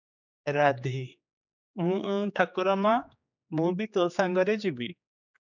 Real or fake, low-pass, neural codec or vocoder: fake; 7.2 kHz; codec, 16 kHz, 4 kbps, X-Codec, HuBERT features, trained on general audio